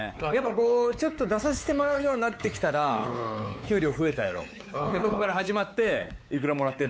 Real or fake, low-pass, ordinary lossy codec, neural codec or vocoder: fake; none; none; codec, 16 kHz, 4 kbps, X-Codec, WavLM features, trained on Multilingual LibriSpeech